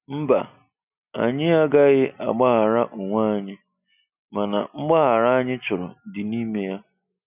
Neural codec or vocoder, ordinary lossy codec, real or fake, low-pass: none; none; real; 3.6 kHz